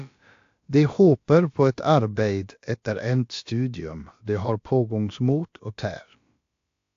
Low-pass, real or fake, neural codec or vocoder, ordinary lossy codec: 7.2 kHz; fake; codec, 16 kHz, about 1 kbps, DyCAST, with the encoder's durations; AAC, 48 kbps